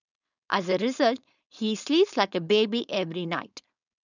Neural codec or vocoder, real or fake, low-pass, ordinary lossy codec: codec, 16 kHz, 4.8 kbps, FACodec; fake; 7.2 kHz; none